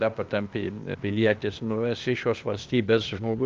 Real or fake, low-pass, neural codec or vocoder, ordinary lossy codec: fake; 7.2 kHz; codec, 16 kHz, 0.8 kbps, ZipCodec; Opus, 24 kbps